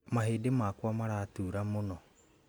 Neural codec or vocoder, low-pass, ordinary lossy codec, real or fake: none; none; none; real